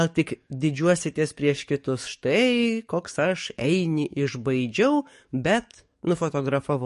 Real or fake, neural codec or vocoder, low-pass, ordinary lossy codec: fake; codec, 44.1 kHz, 7.8 kbps, DAC; 14.4 kHz; MP3, 48 kbps